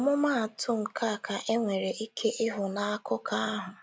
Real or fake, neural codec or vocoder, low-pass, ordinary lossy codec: real; none; none; none